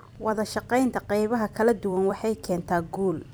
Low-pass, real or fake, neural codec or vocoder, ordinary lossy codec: none; fake; vocoder, 44.1 kHz, 128 mel bands every 256 samples, BigVGAN v2; none